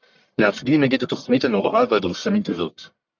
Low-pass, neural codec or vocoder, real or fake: 7.2 kHz; codec, 44.1 kHz, 1.7 kbps, Pupu-Codec; fake